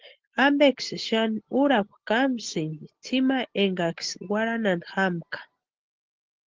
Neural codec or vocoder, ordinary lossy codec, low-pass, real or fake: none; Opus, 16 kbps; 7.2 kHz; real